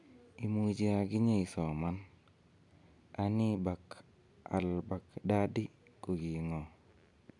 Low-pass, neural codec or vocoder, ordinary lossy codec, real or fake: 10.8 kHz; none; none; real